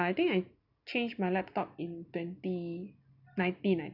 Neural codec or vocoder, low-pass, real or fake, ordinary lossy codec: none; 5.4 kHz; real; none